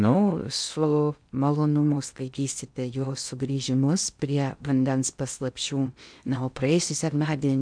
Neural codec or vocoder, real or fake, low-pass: codec, 16 kHz in and 24 kHz out, 0.6 kbps, FocalCodec, streaming, 2048 codes; fake; 9.9 kHz